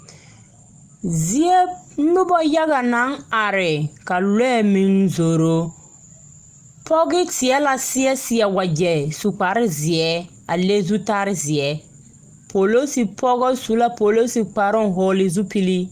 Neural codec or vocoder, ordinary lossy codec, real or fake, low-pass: none; Opus, 24 kbps; real; 14.4 kHz